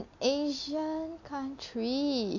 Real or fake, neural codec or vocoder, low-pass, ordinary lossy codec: real; none; 7.2 kHz; MP3, 48 kbps